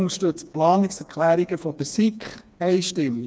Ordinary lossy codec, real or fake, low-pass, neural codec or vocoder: none; fake; none; codec, 16 kHz, 2 kbps, FreqCodec, smaller model